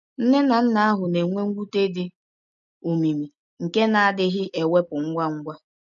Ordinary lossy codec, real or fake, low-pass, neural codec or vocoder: none; real; 7.2 kHz; none